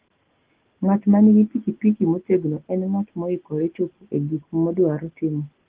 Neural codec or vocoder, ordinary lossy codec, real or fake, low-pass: none; Opus, 16 kbps; real; 3.6 kHz